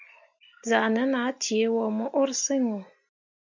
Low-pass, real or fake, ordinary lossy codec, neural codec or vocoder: 7.2 kHz; real; MP3, 64 kbps; none